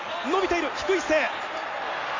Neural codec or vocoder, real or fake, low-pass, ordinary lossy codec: none; real; 7.2 kHz; AAC, 32 kbps